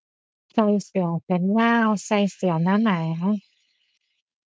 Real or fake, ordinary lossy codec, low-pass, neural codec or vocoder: fake; none; none; codec, 16 kHz, 4.8 kbps, FACodec